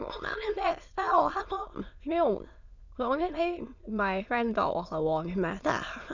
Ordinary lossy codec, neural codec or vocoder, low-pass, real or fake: none; autoencoder, 22.05 kHz, a latent of 192 numbers a frame, VITS, trained on many speakers; 7.2 kHz; fake